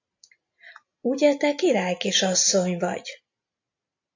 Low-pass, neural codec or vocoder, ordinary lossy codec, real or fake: 7.2 kHz; none; AAC, 48 kbps; real